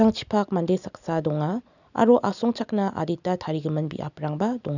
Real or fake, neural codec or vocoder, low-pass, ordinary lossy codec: real; none; 7.2 kHz; none